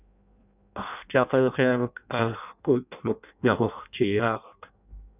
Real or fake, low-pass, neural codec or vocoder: fake; 3.6 kHz; codec, 16 kHz in and 24 kHz out, 0.6 kbps, FireRedTTS-2 codec